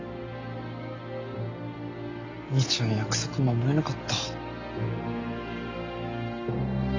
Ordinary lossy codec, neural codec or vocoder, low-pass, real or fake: AAC, 48 kbps; none; 7.2 kHz; real